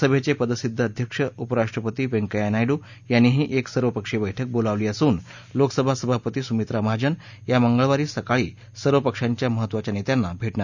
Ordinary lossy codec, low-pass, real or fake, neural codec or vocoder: none; 7.2 kHz; real; none